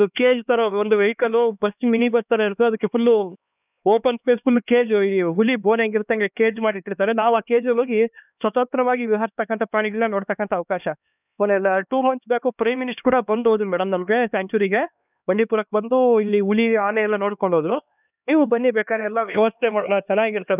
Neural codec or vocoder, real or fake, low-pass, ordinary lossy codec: codec, 16 kHz, 2 kbps, X-Codec, HuBERT features, trained on LibriSpeech; fake; 3.6 kHz; none